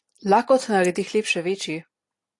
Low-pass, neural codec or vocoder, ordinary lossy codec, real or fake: 10.8 kHz; none; AAC, 48 kbps; real